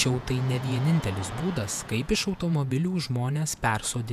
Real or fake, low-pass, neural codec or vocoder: fake; 14.4 kHz; vocoder, 48 kHz, 128 mel bands, Vocos